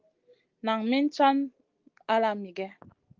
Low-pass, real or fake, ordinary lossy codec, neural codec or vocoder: 7.2 kHz; real; Opus, 32 kbps; none